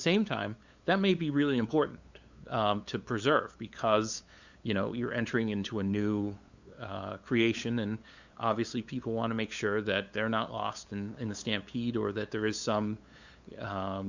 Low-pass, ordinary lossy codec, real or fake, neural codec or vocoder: 7.2 kHz; Opus, 64 kbps; fake; codec, 16 kHz, 8 kbps, FunCodec, trained on LibriTTS, 25 frames a second